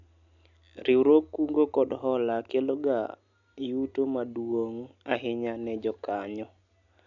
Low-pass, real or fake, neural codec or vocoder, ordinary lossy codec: 7.2 kHz; real; none; none